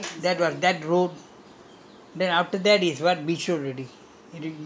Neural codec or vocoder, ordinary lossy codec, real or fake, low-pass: none; none; real; none